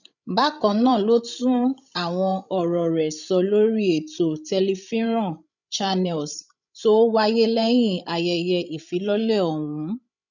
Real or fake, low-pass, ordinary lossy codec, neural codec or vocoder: fake; 7.2 kHz; none; codec, 16 kHz, 16 kbps, FreqCodec, larger model